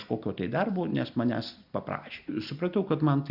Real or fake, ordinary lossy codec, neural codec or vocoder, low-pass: real; Opus, 64 kbps; none; 5.4 kHz